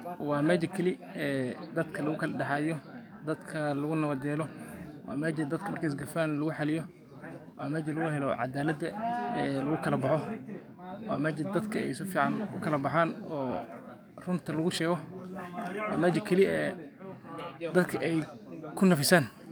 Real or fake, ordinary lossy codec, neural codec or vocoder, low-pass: fake; none; codec, 44.1 kHz, 7.8 kbps, DAC; none